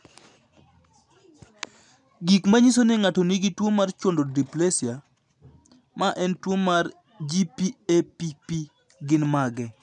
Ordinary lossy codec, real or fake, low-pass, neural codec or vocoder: none; real; 10.8 kHz; none